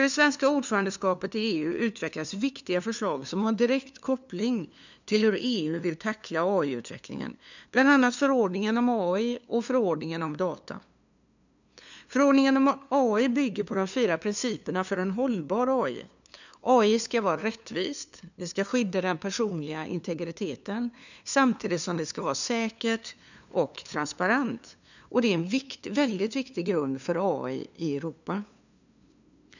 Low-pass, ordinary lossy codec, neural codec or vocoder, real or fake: 7.2 kHz; none; codec, 16 kHz, 2 kbps, FunCodec, trained on LibriTTS, 25 frames a second; fake